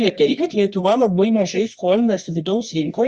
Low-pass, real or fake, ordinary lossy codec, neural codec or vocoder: 10.8 kHz; fake; Opus, 64 kbps; codec, 24 kHz, 0.9 kbps, WavTokenizer, medium music audio release